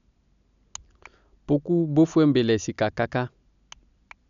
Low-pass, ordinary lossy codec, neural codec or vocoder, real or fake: 7.2 kHz; none; none; real